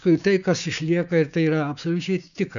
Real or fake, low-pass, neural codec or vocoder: fake; 7.2 kHz; codec, 16 kHz, 6 kbps, DAC